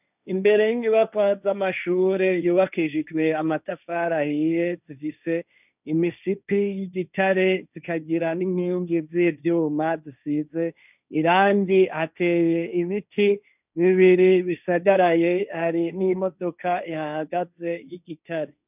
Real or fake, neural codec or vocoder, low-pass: fake; codec, 16 kHz, 1.1 kbps, Voila-Tokenizer; 3.6 kHz